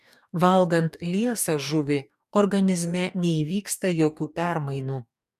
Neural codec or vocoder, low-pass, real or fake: codec, 44.1 kHz, 2.6 kbps, DAC; 14.4 kHz; fake